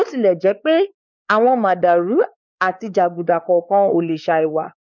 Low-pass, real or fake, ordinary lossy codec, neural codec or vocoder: 7.2 kHz; fake; none; codec, 16 kHz, 4 kbps, X-Codec, WavLM features, trained on Multilingual LibriSpeech